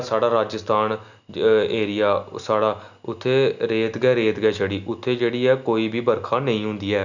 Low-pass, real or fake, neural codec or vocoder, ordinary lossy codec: 7.2 kHz; real; none; none